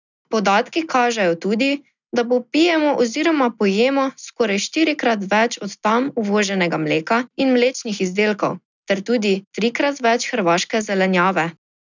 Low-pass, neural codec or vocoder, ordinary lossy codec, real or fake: 7.2 kHz; none; none; real